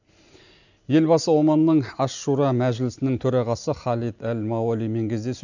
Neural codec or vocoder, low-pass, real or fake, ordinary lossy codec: none; 7.2 kHz; real; none